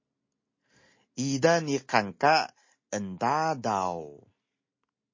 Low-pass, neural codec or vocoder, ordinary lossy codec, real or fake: 7.2 kHz; none; MP3, 32 kbps; real